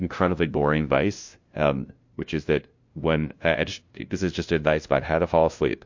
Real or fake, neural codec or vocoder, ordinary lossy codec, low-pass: fake; codec, 16 kHz, 0.5 kbps, FunCodec, trained on LibriTTS, 25 frames a second; MP3, 48 kbps; 7.2 kHz